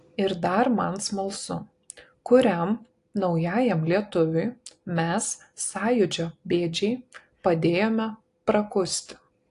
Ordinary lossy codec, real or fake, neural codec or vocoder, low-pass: AAC, 48 kbps; real; none; 10.8 kHz